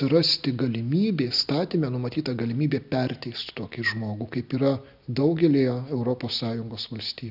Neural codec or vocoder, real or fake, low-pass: none; real; 5.4 kHz